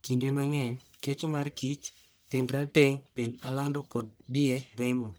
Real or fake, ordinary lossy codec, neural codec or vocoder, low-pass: fake; none; codec, 44.1 kHz, 1.7 kbps, Pupu-Codec; none